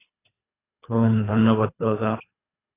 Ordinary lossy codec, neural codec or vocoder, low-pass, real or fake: AAC, 16 kbps; codec, 24 kHz, 3 kbps, HILCodec; 3.6 kHz; fake